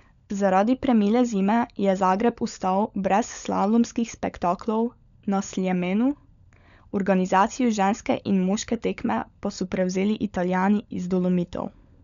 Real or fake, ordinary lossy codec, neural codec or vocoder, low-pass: fake; none; codec, 16 kHz, 16 kbps, FunCodec, trained on LibriTTS, 50 frames a second; 7.2 kHz